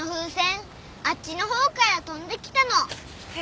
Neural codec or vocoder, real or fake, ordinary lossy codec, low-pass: none; real; none; none